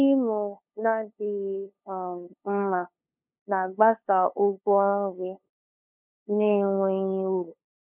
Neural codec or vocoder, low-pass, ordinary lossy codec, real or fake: codec, 16 kHz, 2 kbps, FunCodec, trained on LibriTTS, 25 frames a second; 3.6 kHz; none; fake